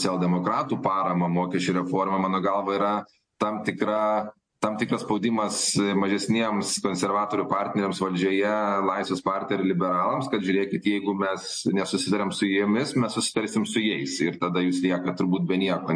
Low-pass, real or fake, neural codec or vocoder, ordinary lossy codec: 9.9 kHz; real; none; MP3, 48 kbps